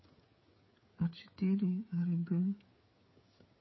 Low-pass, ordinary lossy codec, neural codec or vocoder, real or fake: 7.2 kHz; MP3, 24 kbps; codec, 16 kHz, 8 kbps, FreqCodec, smaller model; fake